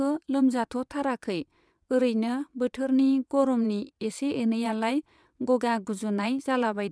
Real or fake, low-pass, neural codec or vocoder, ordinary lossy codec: fake; none; vocoder, 22.05 kHz, 80 mel bands, WaveNeXt; none